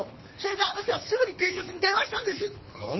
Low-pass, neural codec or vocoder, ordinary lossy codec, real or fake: 7.2 kHz; codec, 24 kHz, 3 kbps, HILCodec; MP3, 24 kbps; fake